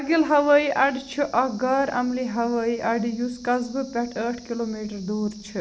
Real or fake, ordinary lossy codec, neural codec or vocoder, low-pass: real; none; none; none